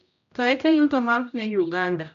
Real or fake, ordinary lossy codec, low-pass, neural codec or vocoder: fake; none; 7.2 kHz; codec, 16 kHz, 0.5 kbps, X-Codec, HuBERT features, trained on general audio